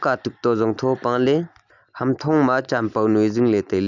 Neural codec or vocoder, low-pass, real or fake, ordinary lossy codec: none; 7.2 kHz; real; none